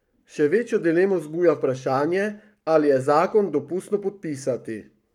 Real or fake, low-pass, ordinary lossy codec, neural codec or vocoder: fake; 19.8 kHz; none; codec, 44.1 kHz, 7.8 kbps, Pupu-Codec